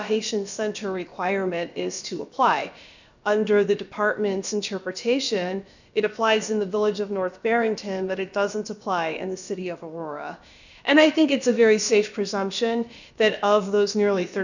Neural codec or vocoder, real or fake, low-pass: codec, 16 kHz, about 1 kbps, DyCAST, with the encoder's durations; fake; 7.2 kHz